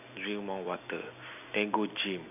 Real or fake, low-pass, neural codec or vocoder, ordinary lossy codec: real; 3.6 kHz; none; none